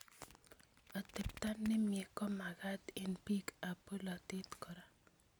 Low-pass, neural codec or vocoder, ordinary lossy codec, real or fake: none; none; none; real